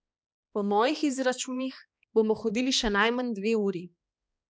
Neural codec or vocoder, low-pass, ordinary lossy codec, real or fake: codec, 16 kHz, 2 kbps, X-Codec, HuBERT features, trained on balanced general audio; none; none; fake